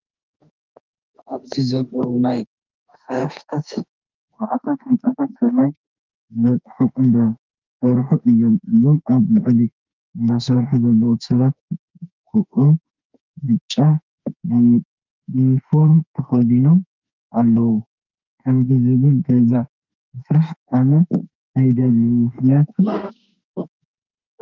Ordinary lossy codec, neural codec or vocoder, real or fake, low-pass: Opus, 24 kbps; autoencoder, 48 kHz, 32 numbers a frame, DAC-VAE, trained on Japanese speech; fake; 7.2 kHz